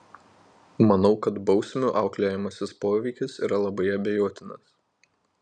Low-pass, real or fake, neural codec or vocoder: 9.9 kHz; real; none